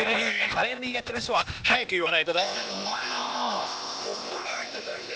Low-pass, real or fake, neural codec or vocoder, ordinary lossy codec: none; fake; codec, 16 kHz, 0.8 kbps, ZipCodec; none